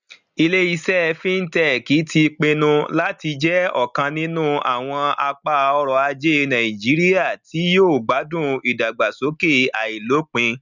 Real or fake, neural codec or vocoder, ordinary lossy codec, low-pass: real; none; none; 7.2 kHz